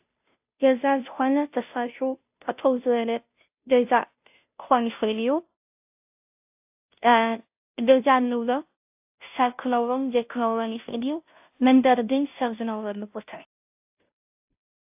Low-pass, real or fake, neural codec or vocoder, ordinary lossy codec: 3.6 kHz; fake; codec, 16 kHz, 0.5 kbps, FunCodec, trained on Chinese and English, 25 frames a second; AAC, 32 kbps